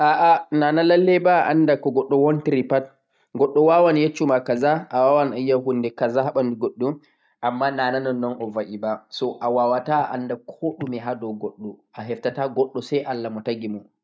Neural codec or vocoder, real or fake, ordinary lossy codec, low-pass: none; real; none; none